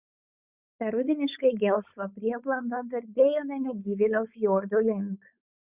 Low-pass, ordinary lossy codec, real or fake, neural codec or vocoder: 3.6 kHz; Opus, 64 kbps; fake; codec, 16 kHz, 4.8 kbps, FACodec